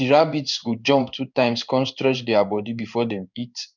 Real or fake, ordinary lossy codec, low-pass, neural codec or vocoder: fake; none; 7.2 kHz; codec, 16 kHz in and 24 kHz out, 1 kbps, XY-Tokenizer